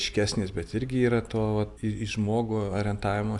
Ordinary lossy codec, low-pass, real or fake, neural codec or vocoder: AAC, 64 kbps; 10.8 kHz; real; none